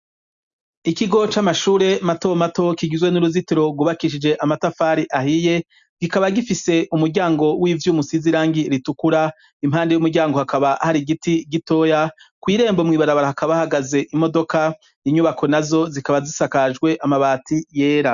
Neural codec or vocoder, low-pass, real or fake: none; 7.2 kHz; real